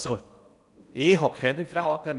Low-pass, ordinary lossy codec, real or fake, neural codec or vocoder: 10.8 kHz; none; fake; codec, 16 kHz in and 24 kHz out, 0.8 kbps, FocalCodec, streaming, 65536 codes